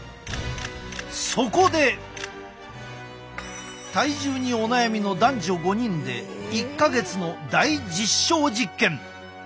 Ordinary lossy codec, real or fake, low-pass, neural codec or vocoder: none; real; none; none